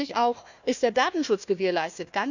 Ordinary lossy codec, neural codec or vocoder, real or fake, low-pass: none; codec, 16 kHz, 1 kbps, FunCodec, trained on Chinese and English, 50 frames a second; fake; 7.2 kHz